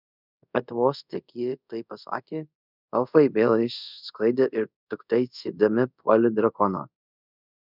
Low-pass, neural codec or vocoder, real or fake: 5.4 kHz; codec, 24 kHz, 0.5 kbps, DualCodec; fake